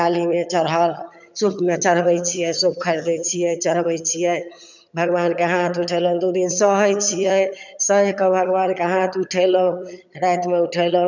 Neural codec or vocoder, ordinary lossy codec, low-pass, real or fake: vocoder, 22.05 kHz, 80 mel bands, HiFi-GAN; none; 7.2 kHz; fake